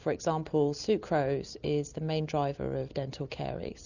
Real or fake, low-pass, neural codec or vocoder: real; 7.2 kHz; none